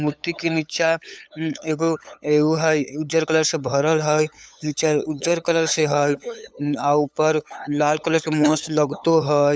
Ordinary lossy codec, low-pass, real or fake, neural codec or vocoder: none; none; fake; codec, 16 kHz, 8 kbps, FunCodec, trained on LibriTTS, 25 frames a second